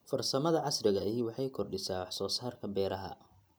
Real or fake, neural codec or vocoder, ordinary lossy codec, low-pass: real; none; none; none